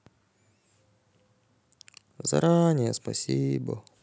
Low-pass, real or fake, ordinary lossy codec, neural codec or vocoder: none; real; none; none